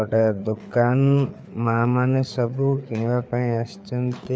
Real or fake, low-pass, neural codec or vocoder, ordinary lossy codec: fake; none; codec, 16 kHz, 4 kbps, FreqCodec, larger model; none